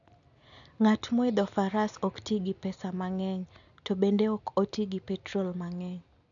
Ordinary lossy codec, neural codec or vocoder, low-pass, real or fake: none; none; 7.2 kHz; real